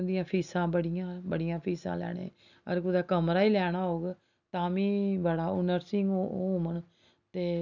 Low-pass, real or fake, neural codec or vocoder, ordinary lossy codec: 7.2 kHz; real; none; none